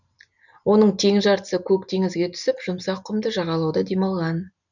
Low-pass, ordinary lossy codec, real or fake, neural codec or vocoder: 7.2 kHz; none; real; none